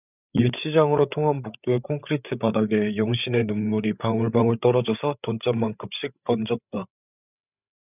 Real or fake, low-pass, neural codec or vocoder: fake; 3.6 kHz; codec, 16 kHz, 8 kbps, FreqCodec, larger model